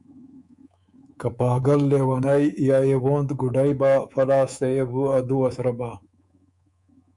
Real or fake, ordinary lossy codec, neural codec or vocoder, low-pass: fake; MP3, 96 kbps; codec, 24 kHz, 3.1 kbps, DualCodec; 10.8 kHz